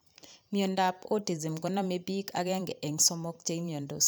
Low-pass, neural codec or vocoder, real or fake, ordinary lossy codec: none; vocoder, 44.1 kHz, 128 mel bands every 512 samples, BigVGAN v2; fake; none